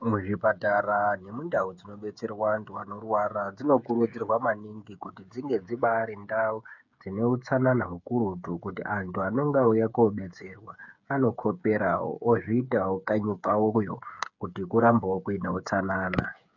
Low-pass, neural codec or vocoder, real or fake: 7.2 kHz; codec, 16 kHz, 8 kbps, FreqCodec, smaller model; fake